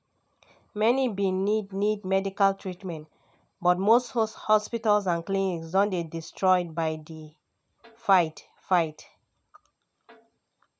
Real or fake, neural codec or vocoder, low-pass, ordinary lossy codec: real; none; none; none